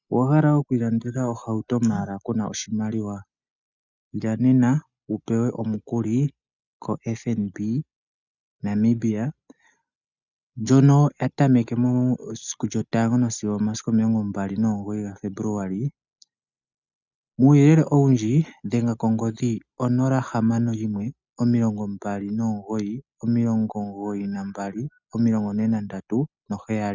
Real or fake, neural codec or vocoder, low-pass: real; none; 7.2 kHz